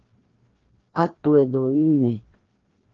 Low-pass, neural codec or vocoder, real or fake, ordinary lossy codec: 7.2 kHz; codec, 16 kHz, 1 kbps, FreqCodec, larger model; fake; Opus, 16 kbps